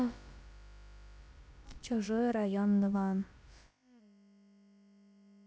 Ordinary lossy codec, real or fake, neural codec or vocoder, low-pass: none; fake; codec, 16 kHz, about 1 kbps, DyCAST, with the encoder's durations; none